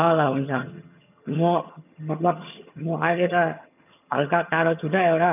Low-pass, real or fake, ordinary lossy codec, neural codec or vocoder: 3.6 kHz; fake; none; vocoder, 22.05 kHz, 80 mel bands, HiFi-GAN